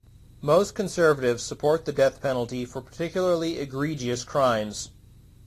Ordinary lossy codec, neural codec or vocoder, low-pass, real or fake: AAC, 48 kbps; none; 14.4 kHz; real